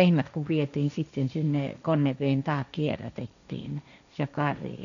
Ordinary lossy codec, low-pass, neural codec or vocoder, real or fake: none; 7.2 kHz; codec, 16 kHz, 1.1 kbps, Voila-Tokenizer; fake